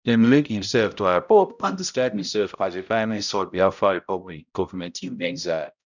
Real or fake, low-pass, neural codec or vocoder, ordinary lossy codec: fake; 7.2 kHz; codec, 16 kHz, 0.5 kbps, X-Codec, HuBERT features, trained on balanced general audio; none